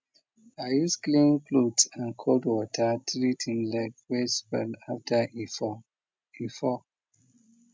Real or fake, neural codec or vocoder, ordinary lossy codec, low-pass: real; none; none; none